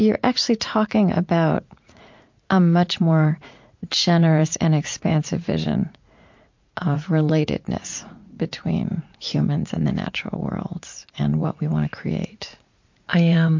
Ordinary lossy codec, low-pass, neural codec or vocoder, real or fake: MP3, 48 kbps; 7.2 kHz; vocoder, 44.1 kHz, 80 mel bands, Vocos; fake